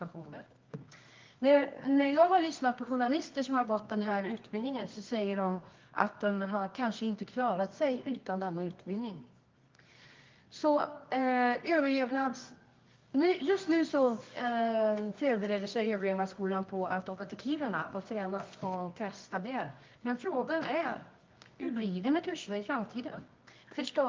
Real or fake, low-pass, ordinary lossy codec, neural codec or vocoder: fake; 7.2 kHz; Opus, 24 kbps; codec, 24 kHz, 0.9 kbps, WavTokenizer, medium music audio release